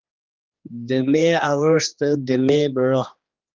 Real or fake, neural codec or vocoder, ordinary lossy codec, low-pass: fake; codec, 16 kHz, 2 kbps, X-Codec, HuBERT features, trained on general audio; Opus, 32 kbps; 7.2 kHz